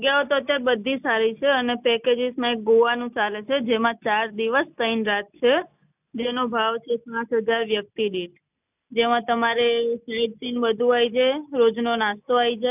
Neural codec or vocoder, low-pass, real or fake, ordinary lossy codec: none; 3.6 kHz; real; none